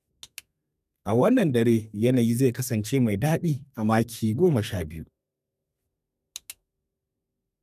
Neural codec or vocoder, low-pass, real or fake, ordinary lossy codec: codec, 32 kHz, 1.9 kbps, SNAC; 14.4 kHz; fake; none